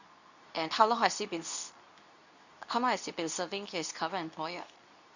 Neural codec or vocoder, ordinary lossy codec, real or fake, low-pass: codec, 24 kHz, 0.9 kbps, WavTokenizer, medium speech release version 2; none; fake; 7.2 kHz